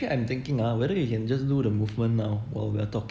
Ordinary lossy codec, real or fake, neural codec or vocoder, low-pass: none; real; none; none